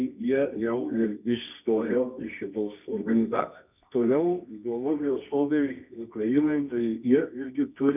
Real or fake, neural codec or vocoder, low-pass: fake; codec, 24 kHz, 0.9 kbps, WavTokenizer, medium music audio release; 3.6 kHz